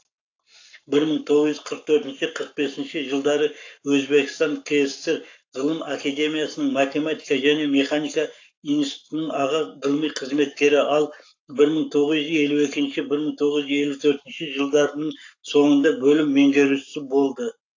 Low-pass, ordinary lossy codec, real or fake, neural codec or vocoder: 7.2 kHz; AAC, 48 kbps; fake; codec, 44.1 kHz, 7.8 kbps, Pupu-Codec